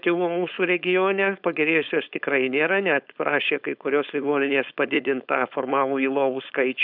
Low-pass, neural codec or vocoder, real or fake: 5.4 kHz; codec, 16 kHz, 4.8 kbps, FACodec; fake